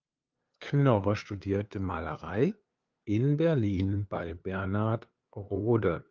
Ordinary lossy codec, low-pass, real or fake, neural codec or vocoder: Opus, 24 kbps; 7.2 kHz; fake; codec, 16 kHz, 2 kbps, FunCodec, trained on LibriTTS, 25 frames a second